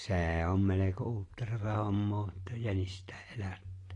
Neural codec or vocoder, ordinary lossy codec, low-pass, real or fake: none; AAC, 32 kbps; 10.8 kHz; real